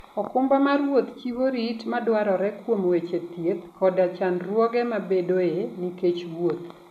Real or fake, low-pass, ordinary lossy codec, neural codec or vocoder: real; 14.4 kHz; none; none